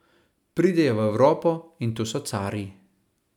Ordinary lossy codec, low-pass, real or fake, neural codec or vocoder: none; 19.8 kHz; real; none